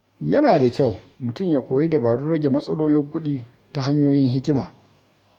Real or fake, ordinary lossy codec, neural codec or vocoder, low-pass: fake; none; codec, 44.1 kHz, 2.6 kbps, DAC; 19.8 kHz